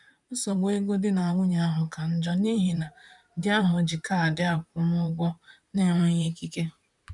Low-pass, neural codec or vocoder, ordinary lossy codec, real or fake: 10.8 kHz; vocoder, 44.1 kHz, 128 mel bands, Pupu-Vocoder; none; fake